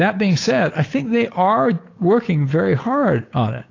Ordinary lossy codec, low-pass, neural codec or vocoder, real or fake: AAC, 32 kbps; 7.2 kHz; codec, 16 kHz, 8 kbps, FunCodec, trained on Chinese and English, 25 frames a second; fake